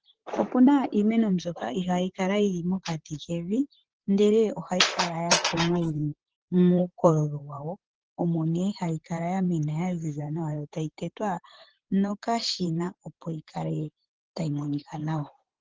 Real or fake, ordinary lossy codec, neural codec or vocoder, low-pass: fake; Opus, 16 kbps; vocoder, 44.1 kHz, 128 mel bands, Pupu-Vocoder; 7.2 kHz